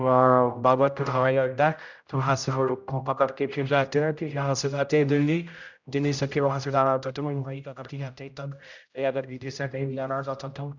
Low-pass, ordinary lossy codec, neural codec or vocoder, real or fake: 7.2 kHz; none; codec, 16 kHz, 0.5 kbps, X-Codec, HuBERT features, trained on general audio; fake